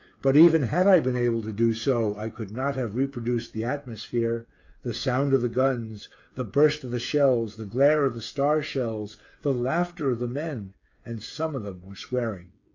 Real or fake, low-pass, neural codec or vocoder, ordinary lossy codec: fake; 7.2 kHz; codec, 16 kHz, 8 kbps, FreqCodec, smaller model; AAC, 48 kbps